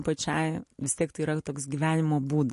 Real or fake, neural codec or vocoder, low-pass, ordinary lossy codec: real; none; 14.4 kHz; MP3, 48 kbps